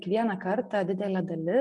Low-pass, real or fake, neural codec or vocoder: 10.8 kHz; real; none